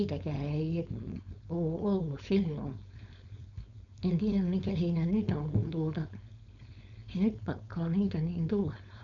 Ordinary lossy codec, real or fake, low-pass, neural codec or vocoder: none; fake; 7.2 kHz; codec, 16 kHz, 4.8 kbps, FACodec